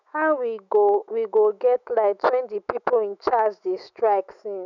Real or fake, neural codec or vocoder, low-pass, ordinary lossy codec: fake; autoencoder, 48 kHz, 128 numbers a frame, DAC-VAE, trained on Japanese speech; 7.2 kHz; none